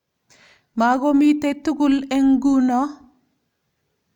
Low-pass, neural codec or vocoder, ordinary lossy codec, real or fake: 19.8 kHz; none; none; real